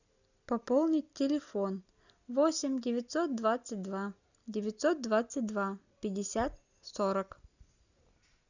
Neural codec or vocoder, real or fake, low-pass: none; real; 7.2 kHz